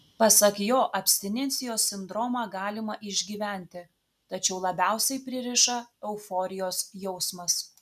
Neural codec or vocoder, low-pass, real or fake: none; 14.4 kHz; real